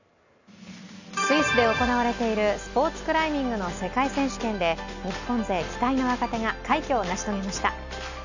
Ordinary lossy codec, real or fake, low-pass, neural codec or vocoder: none; real; 7.2 kHz; none